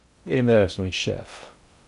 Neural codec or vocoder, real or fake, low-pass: codec, 16 kHz in and 24 kHz out, 0.6 kbps, FocalCodec, streaming, 2048 codes; fake; 10.8 kHz